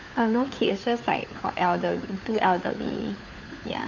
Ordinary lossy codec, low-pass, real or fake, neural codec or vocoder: none; 7.2 kHz; fake; codec, 16 kHz, 4 kbps, FunCodec, trained on LibriTTS, 50 frames a second